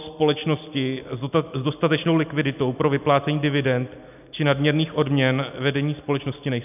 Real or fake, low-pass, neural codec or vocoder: real; 3.6 kHz; none